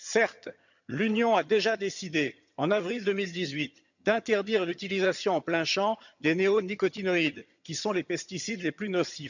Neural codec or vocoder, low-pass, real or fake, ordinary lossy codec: vocoder, 22.05 kHz, 80 mel bands, HiFi-GAN; 7.2 kHz; fake; none